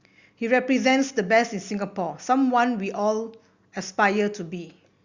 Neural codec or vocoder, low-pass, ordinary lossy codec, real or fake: none; 7.2 kHz; Opus, 64 kbps; real